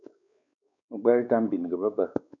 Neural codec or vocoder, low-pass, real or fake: codec, 16 kHz, 4 kbps, X-Codec, WavLM features, trained on Multilingual LibriSpeech; 7.2 kHz; fake